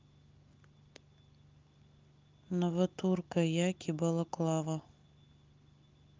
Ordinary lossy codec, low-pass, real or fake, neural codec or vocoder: Opus, 24 kbps; 7.2 kHz; real; none